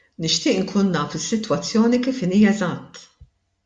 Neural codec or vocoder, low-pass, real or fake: none; 9.9 kHz; real